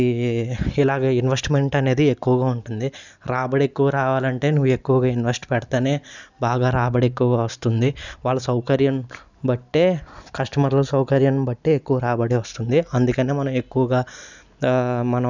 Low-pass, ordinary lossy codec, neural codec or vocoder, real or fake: 7.2 kHz; none; none; real